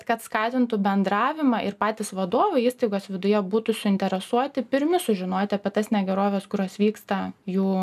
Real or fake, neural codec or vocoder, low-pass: real; none; 14.4 kHz